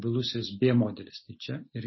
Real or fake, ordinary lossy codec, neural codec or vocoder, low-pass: real; MP3, 24 kbps; none; 7.2 kHz